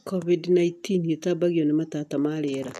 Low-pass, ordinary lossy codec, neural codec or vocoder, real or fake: 14.4 kHz; none; none; real